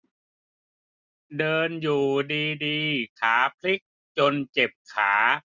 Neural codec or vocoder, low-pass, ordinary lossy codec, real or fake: none; 7.2 kHz; none; real